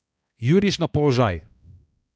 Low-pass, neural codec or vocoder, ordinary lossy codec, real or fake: none; codec, 16 kHz, 1 kbps, X-Codec, HuBERT features, trained on balanced general audio; none; fake